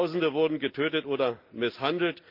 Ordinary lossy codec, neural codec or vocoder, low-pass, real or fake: Opus, 24 kbps; none; 5.4 kHz; real